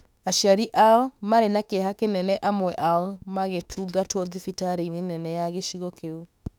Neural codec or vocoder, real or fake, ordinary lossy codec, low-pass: autoencoder, 48 kHz, 32 numbers a frame, DAC-VAE, trained on Japanese speech; fake; none; 19.8 kHz